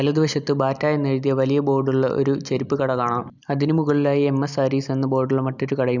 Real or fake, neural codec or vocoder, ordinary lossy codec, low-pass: real; none; none; 7.2 kHz